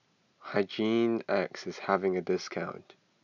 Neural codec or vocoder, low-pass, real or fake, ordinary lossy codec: none; 7.2 kHz; real; none